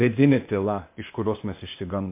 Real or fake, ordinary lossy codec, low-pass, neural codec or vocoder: fake; MP3, 24 kbps; 3.6 kHz; codec, 16 kHz, 0.8 kbps, ZipCodec